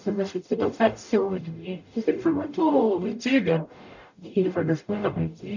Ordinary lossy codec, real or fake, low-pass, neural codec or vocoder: none; fake; 7.2 kHz; codec, 44.1 kHz, 0.9 kbps, DAC